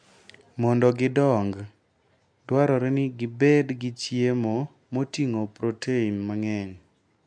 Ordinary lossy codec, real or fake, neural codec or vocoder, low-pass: MP3, 64 kbps; real; none; 9.9 kHz